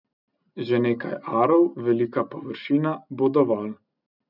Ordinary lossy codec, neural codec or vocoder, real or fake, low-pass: none; none; real; 5.4 kHz